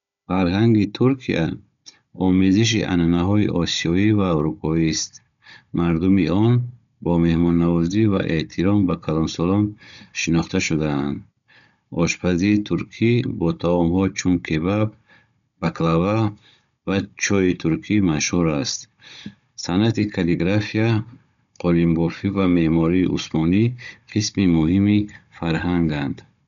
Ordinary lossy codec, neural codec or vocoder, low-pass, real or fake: none; codec, 16 kHz, 16 kbps, FunCodec, trained on Chinese and English, 50 frames a second; 7.2 kHz; fake